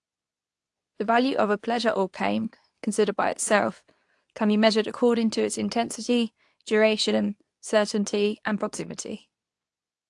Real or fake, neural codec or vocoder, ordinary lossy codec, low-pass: fake; codec, 24 kHz, 0.9 kbps, WavTokenizer, medium speech release version 2; AAC, 64 kbps; 10.8 kHz